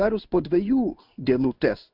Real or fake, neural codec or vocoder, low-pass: fake; codec, 16 kHz in and 24 kHz out, 1 kbps, XY-Tokenizer; 5.4 kHz